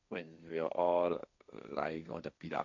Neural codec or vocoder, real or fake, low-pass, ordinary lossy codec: codec, 16 kHz, 1.1 kbps, Voila-Tokenizer; fake; 7.2 kHz; none